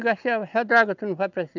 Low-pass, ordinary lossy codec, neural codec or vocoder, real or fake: 7.2 kHz; none; none; real